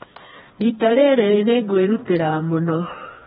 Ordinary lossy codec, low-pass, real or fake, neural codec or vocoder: AAC, 16 kbps; 7.2 kHz; fake; codec, 16 kHz, 2 kbps, FreqCodec, smaller model